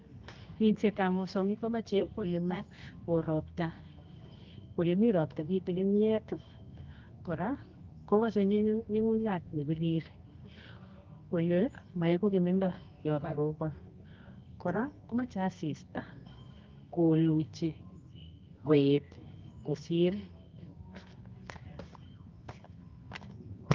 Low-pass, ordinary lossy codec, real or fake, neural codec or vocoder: 7.2 kHz; Opus, 24 kbps; fake; codec, 24 kHz, 0.9 kbps, WavTokenizer, medium music audio release